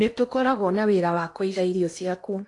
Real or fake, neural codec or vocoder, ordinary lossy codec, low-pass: fake; codec, 16 kHz in and 24 kHz out, 0.8 kbps, FocalCodec, streaming, 65536 codes; AAC, 48 kbps; 10.8 kHz